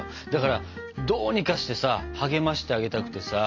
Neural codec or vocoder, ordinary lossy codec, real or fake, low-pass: none; MP3, 64 kbps; real; 7.2 kHz